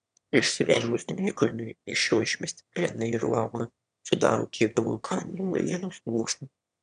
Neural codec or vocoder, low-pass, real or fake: autoencoder, 22.05 kHz, a latent of 192 numbers a frame, VITS, trained on one speaker; 9.9 kHz; fake